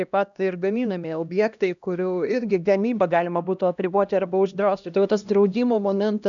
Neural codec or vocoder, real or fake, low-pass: codec, 16 kHz, 1 kbps, X-Codec, HuBERT features, trained on LibriSpeech; fake; 7.2 kHz